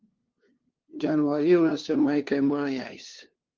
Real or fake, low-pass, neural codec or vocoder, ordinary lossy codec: fake; 7.2 kHz; codec, 16 kHz, 2 kbps, FunCodec, trained on LibriTTS, 25 frames a second; Opus, 16 kbps